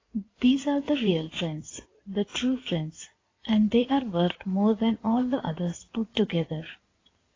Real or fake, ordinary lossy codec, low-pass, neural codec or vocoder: fake; AAC, 32 kbps; 7.2 kHz; codec, 16 kHz in and 24 kHz out, 2.2 kbps, FireRedTTS-2 codec